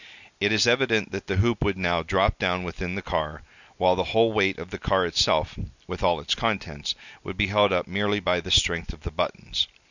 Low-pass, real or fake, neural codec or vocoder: 7.2 kHz; real; none